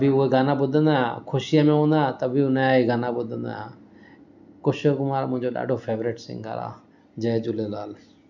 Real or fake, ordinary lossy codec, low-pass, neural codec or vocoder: real; none; 7.2 kHz; none